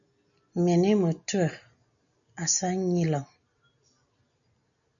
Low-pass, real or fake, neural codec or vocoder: 7.2 kHz; real; none